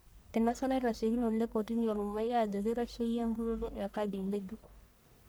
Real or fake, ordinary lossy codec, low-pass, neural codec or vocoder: fake; none; none; codec, 44.1 kHz, 1.7 kbps, Pupu-Codec